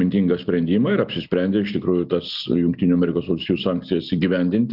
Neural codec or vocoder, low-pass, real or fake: none; 5.4 kHz; real